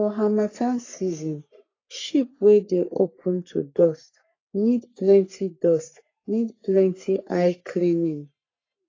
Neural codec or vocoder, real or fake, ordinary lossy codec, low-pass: codec, 44.1 kHz, 3.4 kbps, Pupu-Codec; fake; AAC, 32 kbps; 7.2 kHz